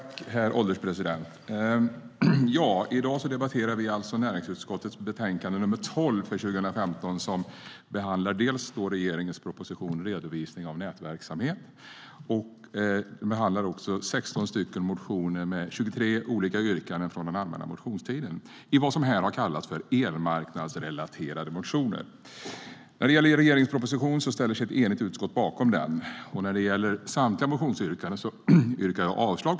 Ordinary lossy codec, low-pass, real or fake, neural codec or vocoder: none; none; real; none